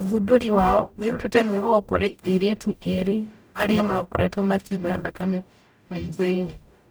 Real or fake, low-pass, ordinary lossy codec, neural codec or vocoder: fake; none; none; codec, 44.1 kHz, 0.9 kbps, DAC